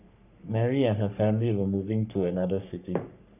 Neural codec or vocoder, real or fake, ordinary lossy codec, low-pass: codec, 44.1 kHz, 3.4 kbps, Pupu-Codec; fake; none; 3.6 kHz